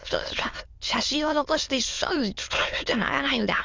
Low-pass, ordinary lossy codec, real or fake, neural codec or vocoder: 7.2 kHz; Opus, 32 kbps; fake; autoencoder, 22.05 kHz, a latent of 192 numbers a frame, VITS, trained on many speakers